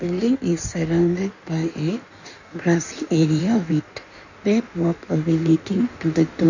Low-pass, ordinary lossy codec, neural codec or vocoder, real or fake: 7.2 kHz; none; codec, 16 kHz in and 24 kHz out, 1.1 kbps, FireRedTTS-2 codec; fake